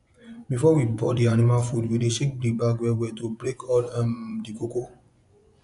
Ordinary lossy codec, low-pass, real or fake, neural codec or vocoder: none; 10.8 kHz; fake; vocoder, 24 kHz, 100 mel bands, Vocos